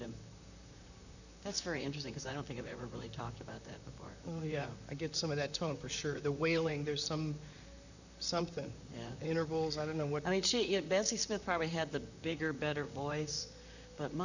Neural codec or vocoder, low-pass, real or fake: vocoder, 44.1 kHz, 128 mel bands, Pupu-Vocoder; 7.2 kHz; fake